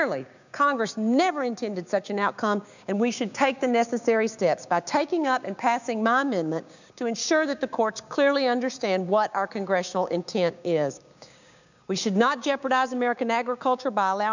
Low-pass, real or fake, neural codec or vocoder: 7.2 kHz; fake; codec, 16 kHz, 6 kbps, DAC